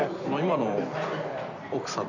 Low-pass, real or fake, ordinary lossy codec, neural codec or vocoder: 7.2 kHz; fake; none; vocoder, 44.1 kHz, 128 mel bands every 256 samples, BigVGAN v2